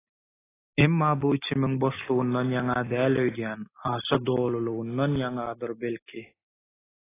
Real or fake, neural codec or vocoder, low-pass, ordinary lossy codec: real; none; 3.6 kHz; AAC, 16 kbps